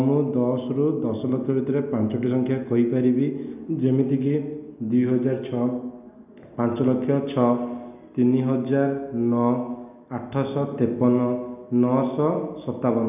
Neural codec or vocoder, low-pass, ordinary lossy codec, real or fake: none; 3.6 kHz; none; real